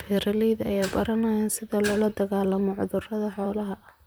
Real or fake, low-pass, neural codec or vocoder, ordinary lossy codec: fake; none; vocoder, 44.1 kHz, 128 mel bands, Pupu-Vocoder; none